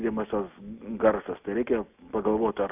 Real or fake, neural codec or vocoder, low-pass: real; none; 3.6 kHz